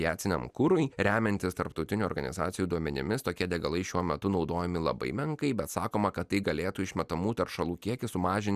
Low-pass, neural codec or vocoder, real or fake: 14.4 kHz; none; real